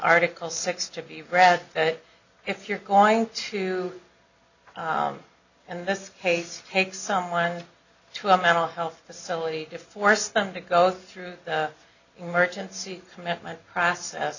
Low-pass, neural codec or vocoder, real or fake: 7.2 kHz; none; real